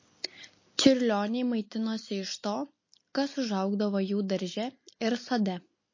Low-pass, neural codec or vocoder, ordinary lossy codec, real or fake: 7.2 kHz; none; MP3, 32 kbps; real